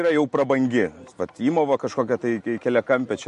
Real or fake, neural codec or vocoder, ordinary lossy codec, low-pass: real; none; MP3, 48 kbps; 14.4 kHz